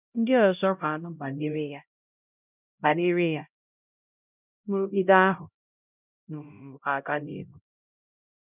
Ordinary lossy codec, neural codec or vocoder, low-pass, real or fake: none; codec, 16 kHz, 0.5 kbps, X-Codec, HuBERT features, trained on LibriSpeech; 3.6 kHz; fake